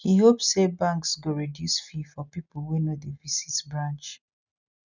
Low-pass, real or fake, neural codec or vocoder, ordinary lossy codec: 7.2 kHz; real; none; none